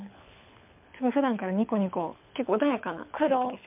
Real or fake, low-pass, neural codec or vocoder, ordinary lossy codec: fake; 3.6 kHz; codec, 24 kHz, 6 kbps, HILCodec; MP3, 32 kbps